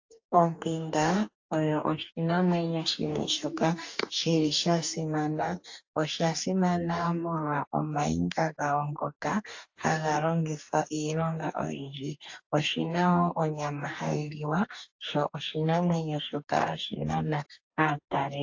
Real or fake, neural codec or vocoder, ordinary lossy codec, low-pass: fake; codec, 44.1 kHz, 2.6 kbps, DAC; AAC, 48 kbps; 7.2 kHz